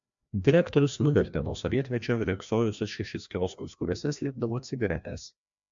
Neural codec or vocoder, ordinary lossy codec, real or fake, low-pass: codec, 16 kHz, 1 kbps, FreqCodec, larger model; MP3, 64 kbps; fake; 7.2 kHz